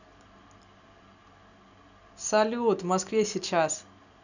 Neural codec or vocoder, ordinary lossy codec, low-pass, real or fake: none; none; 7.2 kHz; real